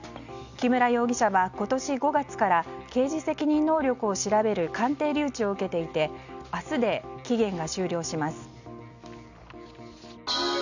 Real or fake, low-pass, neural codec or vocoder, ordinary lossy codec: real; 7.2 kHz; none; none